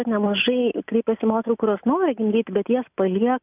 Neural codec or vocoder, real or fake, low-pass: none; real; 3.6 kHz